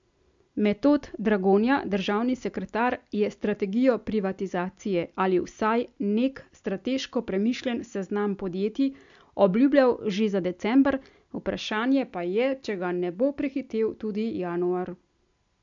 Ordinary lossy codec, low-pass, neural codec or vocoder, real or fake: MP3, 64 kbps; 7.2 kHz; none; real